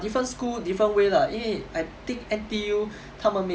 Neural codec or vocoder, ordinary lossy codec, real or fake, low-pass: none; none; real; none